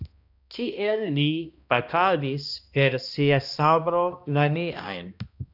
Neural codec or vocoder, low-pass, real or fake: codec, 16 kHz, 1 kbps, X-Codec, HuBERT features, trained on balanced general audio; 5.4 kHz; fake